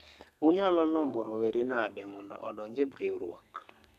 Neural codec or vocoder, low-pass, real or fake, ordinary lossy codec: codec, 32 kHz, 1.9 kbps, SNAC; 14.4 kHz; fake; none